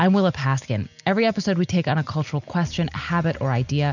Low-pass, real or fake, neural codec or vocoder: 7.2 kHz; real; none